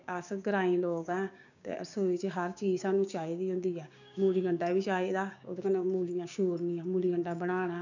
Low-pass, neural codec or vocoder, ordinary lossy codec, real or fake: 7.2 kHz; codec, 16 kHz, 6 kbps, DAC; none; fake